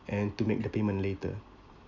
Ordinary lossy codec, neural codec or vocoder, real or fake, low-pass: none; none; real; 7.2 kHz